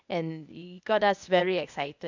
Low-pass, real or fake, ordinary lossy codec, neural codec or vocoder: 7.2 kHz; fake; none; codec, 16 kHz, 0.8 kbps, ZipCodec